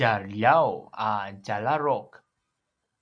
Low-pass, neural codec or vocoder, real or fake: 9.9 kHz; none; real